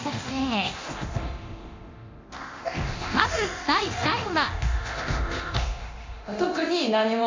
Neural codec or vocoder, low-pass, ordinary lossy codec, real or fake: codec, 24 kHz, 0.9 kbps, DualCodec; 7.2 kHz; MP3, 48 kbps; fake